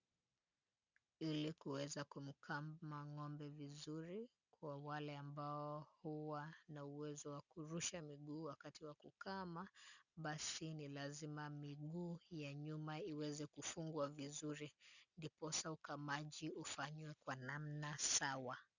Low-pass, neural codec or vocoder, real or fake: 7.2 kHz; none; real